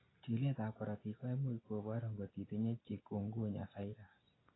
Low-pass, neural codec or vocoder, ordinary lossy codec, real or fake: 7.2 kHz; none; AAC, 16 kbps; real